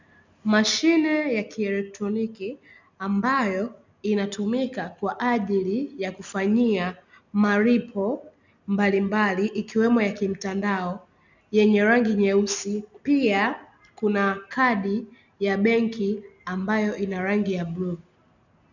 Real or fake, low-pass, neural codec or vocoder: real; 7.2 kHz; none